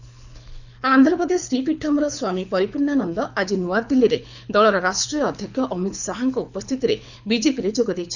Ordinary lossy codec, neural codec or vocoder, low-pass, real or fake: none; codec, 24 kHz, 6 kbps, HILCodec; 7.2 kHz; fake